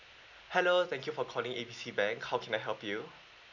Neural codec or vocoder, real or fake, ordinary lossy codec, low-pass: none; real; none; 7.2 kHz